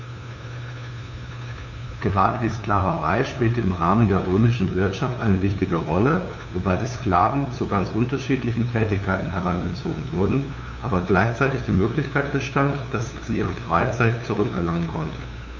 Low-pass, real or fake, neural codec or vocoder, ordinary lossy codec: 7.2 kHz; fake; codec, 16 kHz, 2 kbps, FunCodec, trained on LibriTTS, 25 frames a second; none